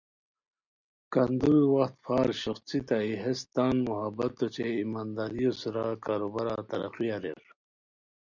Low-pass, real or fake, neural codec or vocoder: 7.2 kHz; real; none